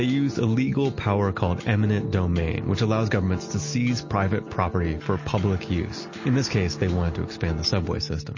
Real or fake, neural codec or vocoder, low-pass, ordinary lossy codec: real; none; 7.2 kHz; MP3, 32 kbps